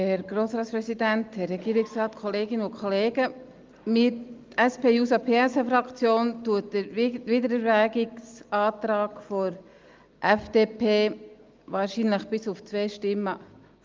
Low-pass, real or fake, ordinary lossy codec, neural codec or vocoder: 7.2 kHz; real; Opus, 32 kbps; none